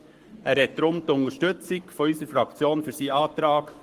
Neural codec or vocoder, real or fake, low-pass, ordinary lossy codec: codec, 44.1 kHz, 7.8 kbps, Pupu-Codec; fake; 14.4 kHz; Opus, 24 kbps